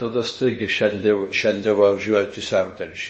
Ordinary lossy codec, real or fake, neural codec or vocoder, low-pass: MP3, 32 kbps; fake; codec, 16 kHz in and 24 kHz out, 0.8 kbps, FocalCodec, streaming, 65536 codes; 10.8 kHz